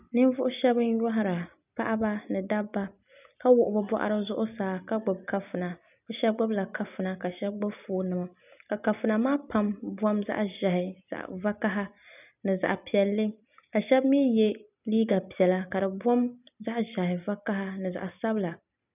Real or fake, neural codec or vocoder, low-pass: real; none; 3.6 kHz